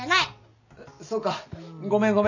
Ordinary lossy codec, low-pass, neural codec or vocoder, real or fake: none; 7.2 kHz; none; real